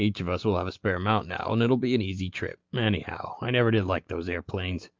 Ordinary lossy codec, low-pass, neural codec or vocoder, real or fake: Opus, 32 kbps; 7.2 kHz; none; real